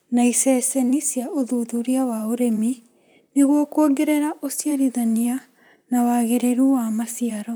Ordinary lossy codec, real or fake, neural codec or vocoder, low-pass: none; fake; vocoder, 44.1 kHz, 128 mel bands, Pupu-Vocoder; none